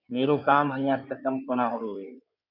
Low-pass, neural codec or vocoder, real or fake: 5.4 kHz; codec, 16 kHz in and 24 kHz out, 2.2 kbps, FireRedTTS-2 codec; fake